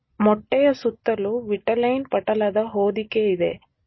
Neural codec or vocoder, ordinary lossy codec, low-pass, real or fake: none; MP3, 24 kbps; 7.2 kHz; real